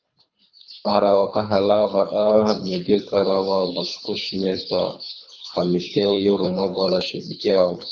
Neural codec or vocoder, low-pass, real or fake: codec, 24 kHz, 3 kbps, HILCodec; 7.2 kHz; fake